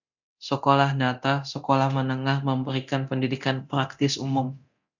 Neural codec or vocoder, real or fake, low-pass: codec, 24 kHz, 0.9 kbps, DualCodec; fake; 7.2 kHz